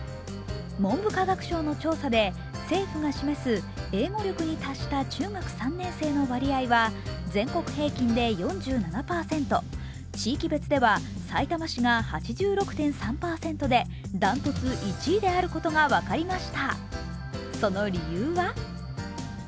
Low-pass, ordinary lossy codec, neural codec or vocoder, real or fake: none; none; none; real